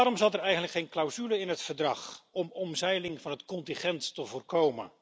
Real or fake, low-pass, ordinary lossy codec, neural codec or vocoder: real; none; none; none